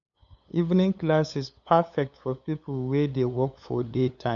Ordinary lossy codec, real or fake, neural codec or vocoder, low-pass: none; fake; codec, 16 kHz, 8 kbps, FunCodec, trained on LibriTTS, 25 frames a second; 7.2 kHz